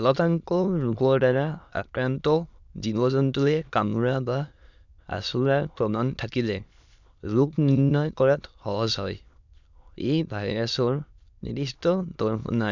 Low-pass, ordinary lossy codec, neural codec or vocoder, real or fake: 7.2 kHz; none; autoencoder, 22.05 kHz, a latent of 192 numbers a frame, VITS, trained on many speakers; fake